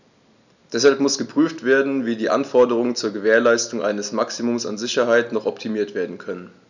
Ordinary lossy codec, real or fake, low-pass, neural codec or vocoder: none; real; 7.2 kHz; none